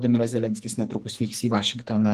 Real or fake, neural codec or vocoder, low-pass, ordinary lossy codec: fake; codec, 44.1 kHz, 2.6 kbps, SNAC; 14.4 kHz; Opus, 24 kbps